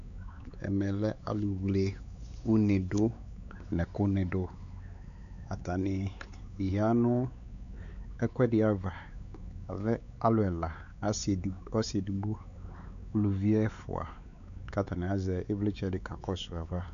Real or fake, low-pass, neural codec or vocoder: fake; 7.2 kHz; codec, 16 kHz, 4 kbps, X-Codec, WavLM features, trained on Multilingual LibriSpeech